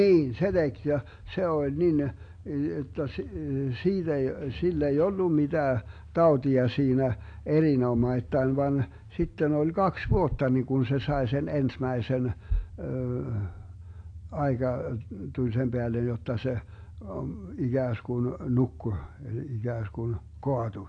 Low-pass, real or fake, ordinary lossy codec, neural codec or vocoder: 9.9 kHz; real; AAC, 48 kbps; none